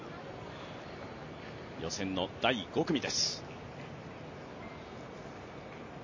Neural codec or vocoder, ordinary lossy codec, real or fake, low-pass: none; MP3, 32 kbps; real; 7.2 kHz